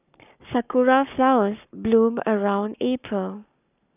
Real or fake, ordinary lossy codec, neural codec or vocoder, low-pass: fake; none; codec, 44.1 kHz, 7.8 kbps, Pupu-Codec; 3.6 kHz